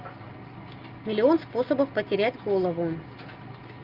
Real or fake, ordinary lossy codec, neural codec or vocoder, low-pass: real; Opus, 24 kbps; none; 5.4 kHz